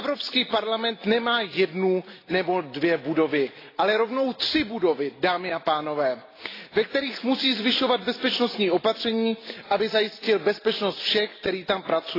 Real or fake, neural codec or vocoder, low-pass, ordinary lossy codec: real; none; 5.4 kHz; AAC, 24 kbps